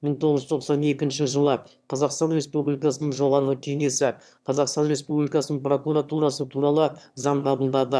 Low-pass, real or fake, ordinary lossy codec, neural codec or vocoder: none; fake; none; autoencoder, 22.05 kHz, a latent of 192 numbers a frame, VITS, trained on one speaker